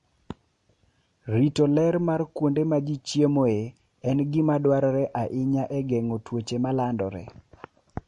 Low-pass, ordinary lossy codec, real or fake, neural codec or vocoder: 14.4 kHz; MP3, 48 kbps; real; none